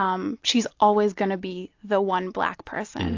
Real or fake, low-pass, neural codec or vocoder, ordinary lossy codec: real; 7.2 kHz; none; AAC, 48 kbps